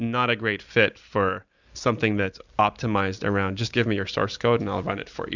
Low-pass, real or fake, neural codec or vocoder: 7.2 kHz; real; none